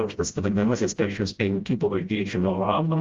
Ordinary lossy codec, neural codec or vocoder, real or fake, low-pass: Opus, 32 kbps; codec, 16 kHz, 0.5 kbps, FreqCodec, smaller model; fake; 7.2 kHz